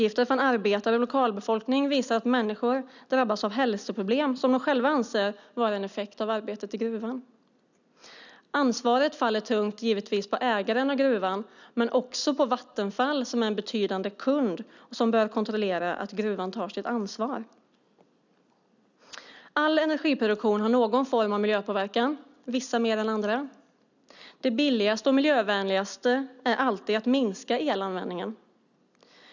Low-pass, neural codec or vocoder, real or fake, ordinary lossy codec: 7.2 kHz; none; real; none